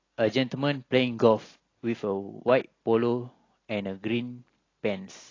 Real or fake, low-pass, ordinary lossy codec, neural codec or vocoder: real; 7.2 kHz; AAC, 32 kbps; none